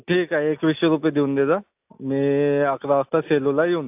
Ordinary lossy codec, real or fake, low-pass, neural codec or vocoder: AAC, 32 kbps; real; 3.6 kHz; none